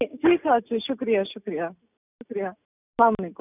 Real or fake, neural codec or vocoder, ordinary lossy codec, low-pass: real; none; none; 3.6 kHz